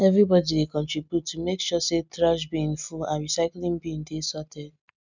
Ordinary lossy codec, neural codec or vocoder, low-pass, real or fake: none; none; 7.2 kHz; real